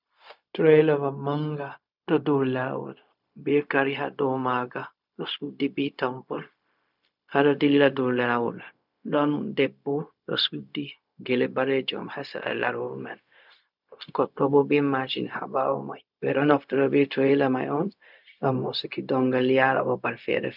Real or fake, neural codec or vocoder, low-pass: fake; codec, 16 kHz, 0.4 kbps, LongCat-Audio-Codec; 5.4 kHz